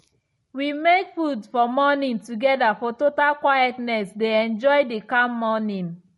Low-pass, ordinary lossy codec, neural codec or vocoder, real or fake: 19.8 kHz; MP3, 48 kbps; none; real